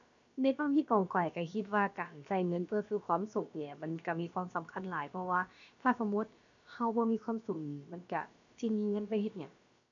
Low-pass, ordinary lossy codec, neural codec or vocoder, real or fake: 7.2 kHz; none; codec, 16 kHz, about 1 kbps, DyCAST, with the encoder's durations; fake